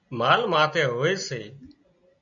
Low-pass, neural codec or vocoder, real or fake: 7.2 kHz; none; real